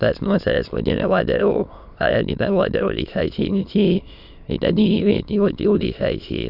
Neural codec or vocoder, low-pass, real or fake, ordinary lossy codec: autoencoder, 22.05 kHz, a latent of 192 numbers a frame, VITS, trained on many speakers; 5.4 kHz; fake; none